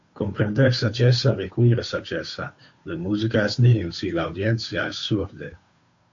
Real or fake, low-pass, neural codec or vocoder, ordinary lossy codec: fake; 7.2 kHz; codec, 16 kHz, 2 kbps, FunCodec, trained on Chinese and English, 25 frames a second; AAC, 48 kbps